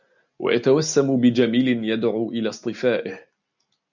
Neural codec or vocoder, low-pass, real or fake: none; 7.2 kHz; real